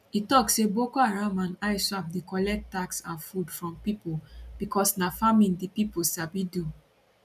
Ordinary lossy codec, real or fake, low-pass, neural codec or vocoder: none; real; 14.4 kHz; none